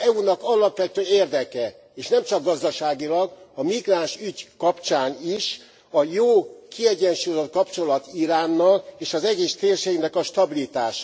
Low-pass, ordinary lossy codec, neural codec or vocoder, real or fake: none; none; none; real